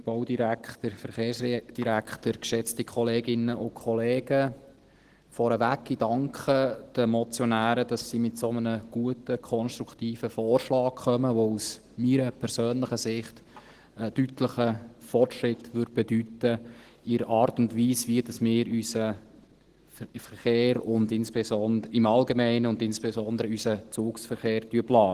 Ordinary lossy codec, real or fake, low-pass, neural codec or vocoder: Opus, 16 kbps; real; 14.4 kHz; none